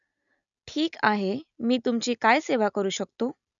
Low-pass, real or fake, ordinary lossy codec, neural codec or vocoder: 7.2 kHz; real; none; none